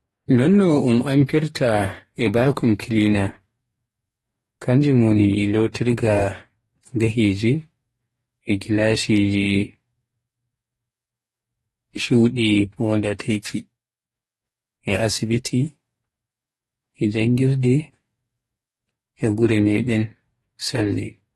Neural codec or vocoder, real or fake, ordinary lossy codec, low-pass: codec, 44.1 kHz, 2.6 kbps, DAC; fake; AAC, 32 kbps; 19.8 kHz